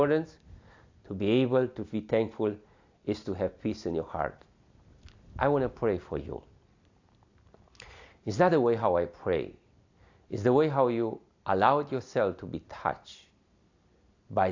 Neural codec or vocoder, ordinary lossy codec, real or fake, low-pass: none; AAC, 48 kbps; real; 7.2 kHz